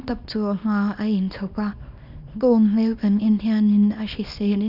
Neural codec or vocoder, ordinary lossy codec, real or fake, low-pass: codec, 24 kHz, 0.9 kbps, WavTokenizer, small release; none; fake; 5.4 kHz